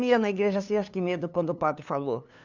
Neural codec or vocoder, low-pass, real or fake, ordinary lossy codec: codec, 16 kHz, 2 kbps, FunCodec, trained on LibriTTS, 25 frames a second; 7.2 kHz; fake; none